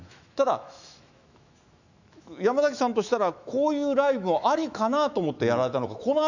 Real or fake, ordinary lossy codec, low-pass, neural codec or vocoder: fake; none; 7.2 kHz; autoencoder, 48 kHz, 128 numbers a frame, DAC-VAE, trained on Japanese speech